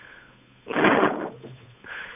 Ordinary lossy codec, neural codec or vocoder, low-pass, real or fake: none; codec, 16 kHz, 8 kbps, FunCodec, trained on Chinese and English, 25 frames a second; 3.6 kHz; fake